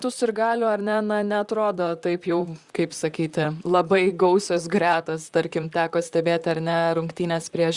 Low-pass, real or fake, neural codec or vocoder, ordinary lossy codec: 10.8 kHz; fake; vocoder, 44.1 kHz, 128 mel bands, Pupu-Vocoder; Opus, 64 kbps